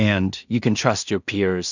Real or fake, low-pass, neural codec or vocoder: fake; 7.2 kHz; codec, 16 kHz in and 24 kHz out, 0.4 kbps, LongCat-Audio-Codec, two codebook decoder